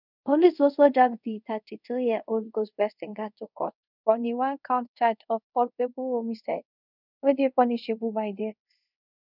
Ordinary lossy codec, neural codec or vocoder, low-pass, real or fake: none; codec, 24 kHz, 0.5 kbps, DualCodec; 5.4 kHz; fake